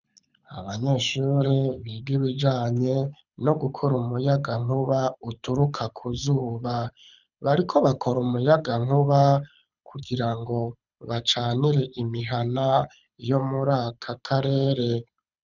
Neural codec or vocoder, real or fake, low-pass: codec, 24 kHz, 6 kbps, HILCodec; fake; 7.2 kHz